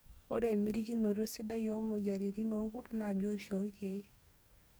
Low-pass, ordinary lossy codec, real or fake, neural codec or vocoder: none; none; fake; codec, 44.1 kHz, 2.6 kbps, DAC